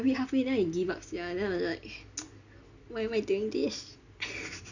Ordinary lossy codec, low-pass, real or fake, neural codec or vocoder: none; 7.2 kHz; real; none